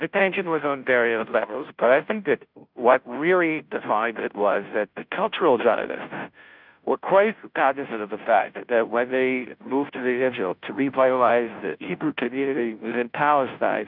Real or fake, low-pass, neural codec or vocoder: fake; 5.4 kHz; codec, 16 kHz, 0.5 kbps, FunCodec, trained on Chinese and English, 25 frames a second